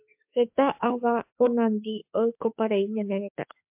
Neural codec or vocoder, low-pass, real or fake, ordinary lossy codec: autoencoder, 48 kHz, 32 numbers a frame, DAC-VAE, trained on Japanese speech; 3.6 kHz; fake; MP3, 32 kbps